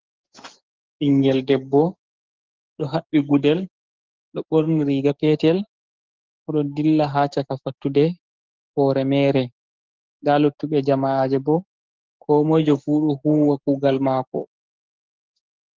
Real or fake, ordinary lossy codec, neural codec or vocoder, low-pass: fake; Opus, 16 kbps; codec, 44.1 kHz, 7.8 kbps, DAC; 7.2 kHz